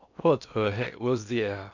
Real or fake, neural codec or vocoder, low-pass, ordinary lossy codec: fake; codec, 16 kHz in and 24 kHz out, 0.8 kbps, FocalCodec, streaming, 65536 codes; 7.2 kHz; none